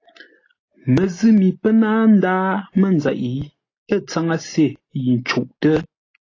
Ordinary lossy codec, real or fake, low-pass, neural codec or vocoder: AAC, 32 kbps; real; 7.2 kHz; none